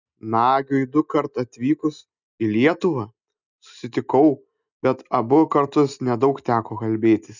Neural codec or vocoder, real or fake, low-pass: none; real; 7.2 kHz